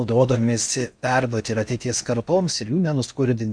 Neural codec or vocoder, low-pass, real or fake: codec, 16 kHz in and 24 kHz out, 0.6 kbps, FocalCodec, streaming, 4096 codes; 9.9 kHz; fake